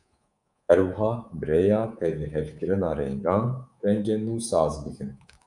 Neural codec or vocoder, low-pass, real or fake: codec, 24 kHz, 3.1 kbps, DualCodec; 10.8 kHz; fake